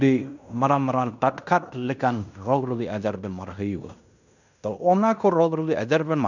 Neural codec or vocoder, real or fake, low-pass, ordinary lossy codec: codec, 16 kHz in and 24 kHz out, 0.9 kbps, LongCat-Audio-Codec, fine tuned four codebook decoder; fake; 7.2 kHz; none